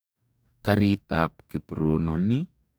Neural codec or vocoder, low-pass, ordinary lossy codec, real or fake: codec, 44.1 kHz, 2.6 kbps, DAC; none; none; fake